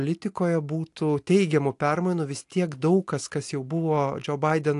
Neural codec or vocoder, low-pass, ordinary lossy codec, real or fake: none; 10.8 kHz; AAC, 64 kbps; real